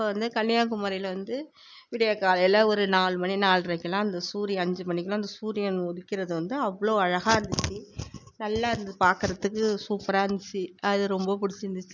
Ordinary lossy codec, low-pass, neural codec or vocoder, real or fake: none; 7.2 kHz; none; real